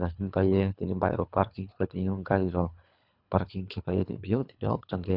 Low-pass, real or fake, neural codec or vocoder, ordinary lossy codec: 5.4 kHz; fake; codec, 24 kHz, 3 kbps, HILCodec; none